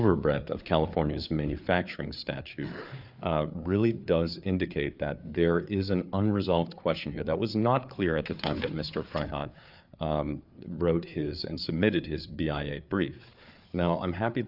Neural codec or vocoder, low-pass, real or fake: codec, 16 kHz, 4 kbps, FreqCodec, larger model; 5.4 kHz; fake